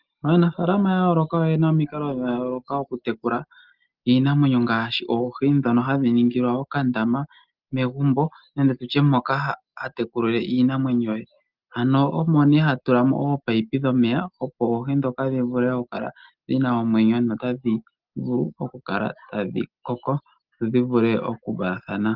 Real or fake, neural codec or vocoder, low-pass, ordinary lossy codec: real; none; 5.4 kHz; Opus, 32 kbps